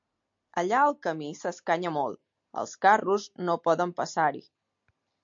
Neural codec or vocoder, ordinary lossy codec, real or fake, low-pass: none; MP3, 48 kbps; real; 7.2 kHz